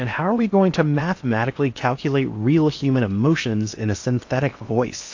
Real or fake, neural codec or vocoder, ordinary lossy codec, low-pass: fake; codec, 16 kHz in and 24 kHz out, 0.8 kbps, FocalCodec, streaming, 65536 codes; AAC, 48 kbps; 7.2 kHz